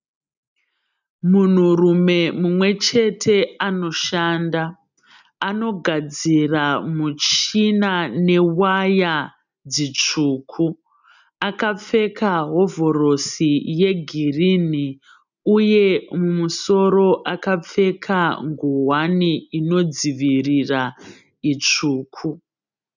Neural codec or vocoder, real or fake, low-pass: none; real; 7.2 kHz